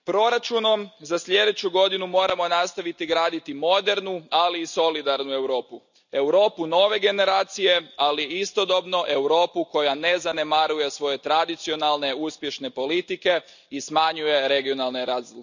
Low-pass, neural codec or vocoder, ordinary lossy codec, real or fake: 7.2 kHz; none; none; real